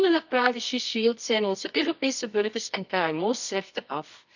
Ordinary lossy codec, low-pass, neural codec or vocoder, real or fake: none; 7.2 kHz; codec, 24 kHz, 0.9 kbps, WavTokenizer, medium music audio release; fake